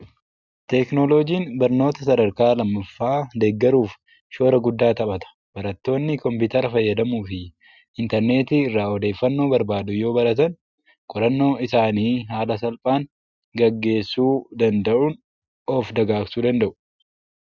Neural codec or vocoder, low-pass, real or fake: none; 7.2 kHz; real